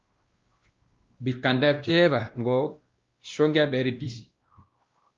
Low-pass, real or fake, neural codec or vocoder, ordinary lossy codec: 7.2 kHz; fake; codec, 16 kHz, 1 kbps, X-Codec, WavLM features, trained on Multilingual LibriSpeech; Opus, 32 kbps